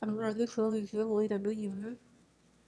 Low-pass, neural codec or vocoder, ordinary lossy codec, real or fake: none; autoencoder, 22.05 kHz, a latent of 192 numbers a frame, VITS, trained on one speaker; none; fake